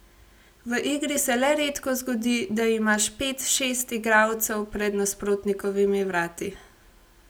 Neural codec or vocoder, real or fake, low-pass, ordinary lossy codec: none; real; none; none